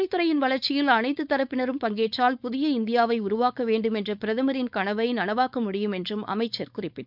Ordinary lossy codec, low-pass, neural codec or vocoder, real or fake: none; 5.4 kHz; codec, 16 kHz, 4.8 kbps, FACodec; fake